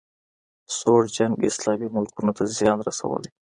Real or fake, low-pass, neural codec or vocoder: fake; 9.9 kHz; vocoder, 22.05 kHz, 80 mel bands, Vocos